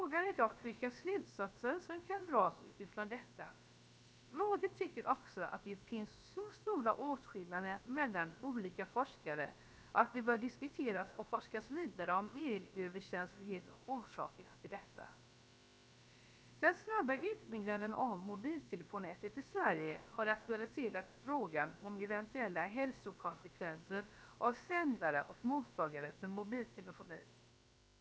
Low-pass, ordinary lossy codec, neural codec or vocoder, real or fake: none; none; codec, 16 kHz, about 1 kbps, DyCAST, with the encoder's durations; fake